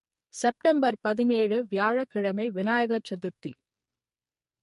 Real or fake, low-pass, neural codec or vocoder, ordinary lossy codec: fake; 14.4 kHz; codec, 44.1 kHz, 2.6 kbps, SNAC; MP3, 48 kbps